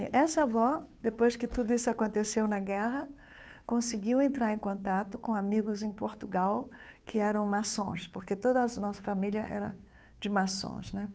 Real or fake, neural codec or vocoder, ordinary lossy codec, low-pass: fake; codec, 16 kHz, 2 kbps, FunCodec, trained on Chinese and English, 25 frames a second; none; none